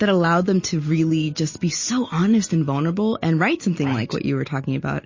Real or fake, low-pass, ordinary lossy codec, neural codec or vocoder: real; 7.2 kHz; MP3, 32 kbps; none